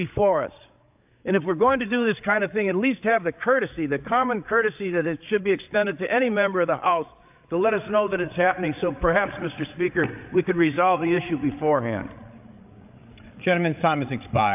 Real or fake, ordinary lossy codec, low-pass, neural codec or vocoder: fake; AAC, 32 kbps; 3.6 kHz; codec, 16 kHz, 8 kbps, FreqCodec, larger model